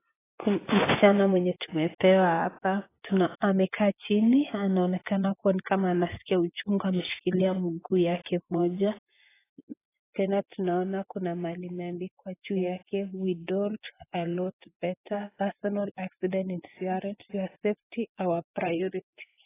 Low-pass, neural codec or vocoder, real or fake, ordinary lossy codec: 3.6 kHz; vocoder, 24 kHz, 100 mel bands, Vocos; fake; AAC, 16 kbps